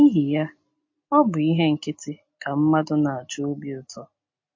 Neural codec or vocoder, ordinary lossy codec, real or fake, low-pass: none; MP3, 32 kbps; real; 7.2 kHz